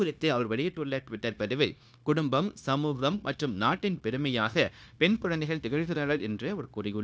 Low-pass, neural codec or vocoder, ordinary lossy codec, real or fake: none; codec, 16 kHz, 0.9 kbps, LongCat-Audio-Codec; none; fake